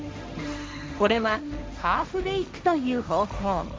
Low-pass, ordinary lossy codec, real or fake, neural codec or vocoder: none; none; fake; codec, 16 kHz, 1.1 kbps, Voila-Tokenizer